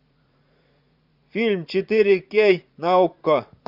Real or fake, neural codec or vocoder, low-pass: fake; vocoder, 44.1 kHz, 80 mel bands, Vocos; 5.4 kHz